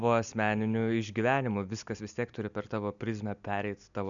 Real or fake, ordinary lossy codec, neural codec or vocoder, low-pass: real; MP3, 96 kbps; none; 7.2 kHz